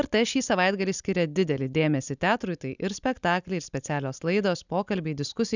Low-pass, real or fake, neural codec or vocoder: 7.2 kHz; real; none